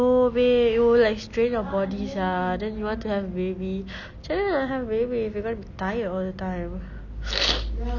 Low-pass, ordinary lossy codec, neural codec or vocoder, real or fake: 7.2 kHz; none; none; real